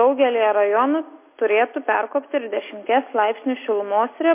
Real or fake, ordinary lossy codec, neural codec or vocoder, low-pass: real; MP3, 24 kbps; none; 3.6 kHz